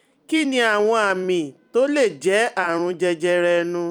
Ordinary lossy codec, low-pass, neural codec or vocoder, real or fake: none; 19.8 kHz; vocoder, 44.1 kHz, 128 mel bands every 256 samples, BigVGAN v2; fake